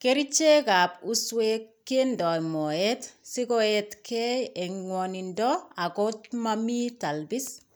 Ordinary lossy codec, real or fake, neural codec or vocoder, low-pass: none; real; none; none